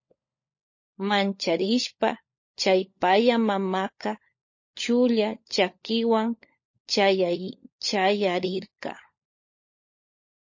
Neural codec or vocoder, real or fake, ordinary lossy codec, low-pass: codec, 16 kHz, 16 kbps, FunCodec, trained on LibriTTS, 50 frames a second; fake; MP3, 32 kbps; 7.2 kHz